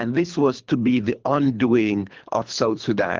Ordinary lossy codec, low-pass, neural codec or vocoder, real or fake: Opus, 32 kbps; 7.2 kHz; codec, 24 kHz, 3 kbps, HILCodec; fake